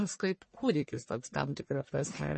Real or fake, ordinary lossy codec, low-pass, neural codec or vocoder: fake; MP3, 32 kbps; 10.8 kHz; codec, 44.1 kHz, 1.7 kbps, Pupu-Codec